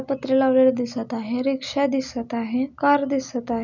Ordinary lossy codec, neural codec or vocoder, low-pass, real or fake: none; none; 7.2 kHz; real